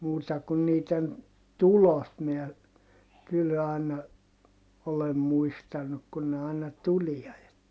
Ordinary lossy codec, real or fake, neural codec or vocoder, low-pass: none; real; none; none